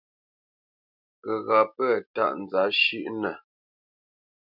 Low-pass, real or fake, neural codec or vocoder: 5.4 kHz; real; none